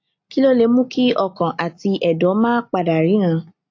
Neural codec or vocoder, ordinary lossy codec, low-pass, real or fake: none; AAC, 48 kbps; 7.2 kHz; real